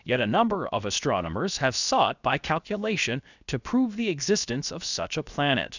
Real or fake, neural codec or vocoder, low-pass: fake; codec, 16 kHz, 0.7 kbps, FocalCodec; 7.2 kHz